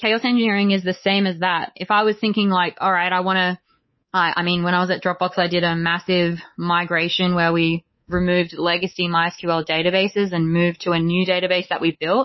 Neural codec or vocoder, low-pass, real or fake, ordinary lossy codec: codec, 24 kHz, 3.1 kbps, DualCodec; 7.2 kHz; fake; MP3, 24 kbps